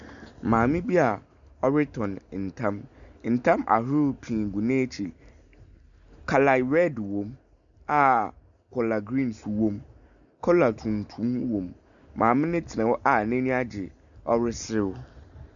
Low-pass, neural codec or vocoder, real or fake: 7.2 kHz; none; real